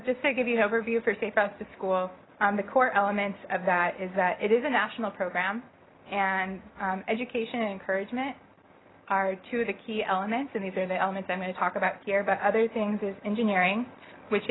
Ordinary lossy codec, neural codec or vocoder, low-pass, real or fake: AAC, 16 kbps; none; 7.2 kHz; real